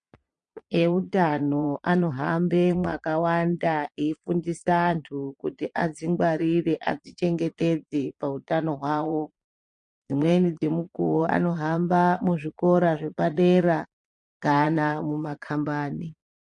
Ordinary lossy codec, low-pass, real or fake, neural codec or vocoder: MP3, 48 kbps; 9.9 kHz; fake; vocoder, 22.05 kHz, 80 mel bands, Vocos